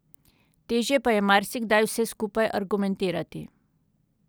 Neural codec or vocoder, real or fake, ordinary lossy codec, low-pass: none; real; none; none